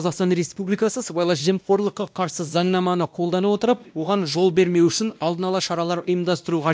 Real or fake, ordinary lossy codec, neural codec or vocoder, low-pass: fake; none; codec, 16 kHz, 1 kbps, X-Codec, WavLM features, trained on Multilingual LibriSpeech; none